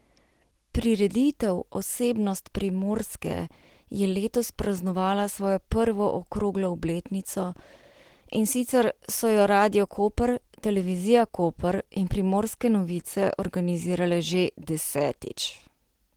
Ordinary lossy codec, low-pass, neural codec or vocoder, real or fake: Opus, 16 kbps; 19.8 kHz; none; real